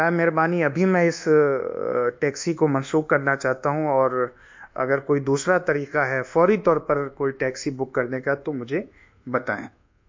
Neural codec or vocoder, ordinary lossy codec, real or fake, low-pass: codec, 24 kHz, 1.2 kbps, DualCodec; MP3, 64 kbps; fake; 7.2 kHz